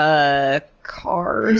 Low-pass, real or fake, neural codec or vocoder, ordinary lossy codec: 7.2 kHz; real; none; Opus, 24 kbps